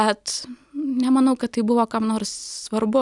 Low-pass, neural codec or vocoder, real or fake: 10.8 kHz; none; real